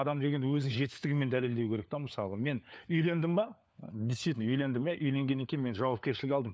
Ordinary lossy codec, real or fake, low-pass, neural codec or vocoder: none; fake; none; codec, 16 kHz, 4 kbps, FunCodec, trained on LibriTTS, 50 frames a second